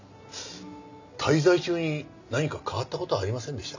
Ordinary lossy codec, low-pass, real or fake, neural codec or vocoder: none; 7.2 kHz; real; none